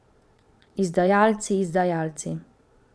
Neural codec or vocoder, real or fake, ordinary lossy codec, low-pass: vocoder, 22.05 kHz, 80 mel bands, Vocos; fake; none; none